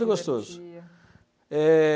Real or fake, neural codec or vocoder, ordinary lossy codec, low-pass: real; none; none; none